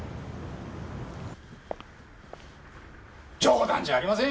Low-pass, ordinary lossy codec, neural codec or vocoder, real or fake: none; none; none; real